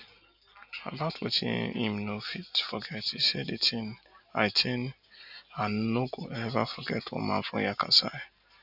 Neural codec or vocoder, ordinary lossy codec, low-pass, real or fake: none; none; 5.4 kHz; real